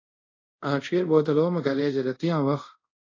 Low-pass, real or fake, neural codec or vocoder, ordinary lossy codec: 7.2 kHz; fake; codec, 24 kHz, 0.5 kbps, DualCodec; AAC, 32 kbps